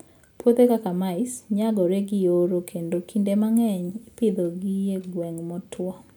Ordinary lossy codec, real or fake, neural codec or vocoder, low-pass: none; real; none; none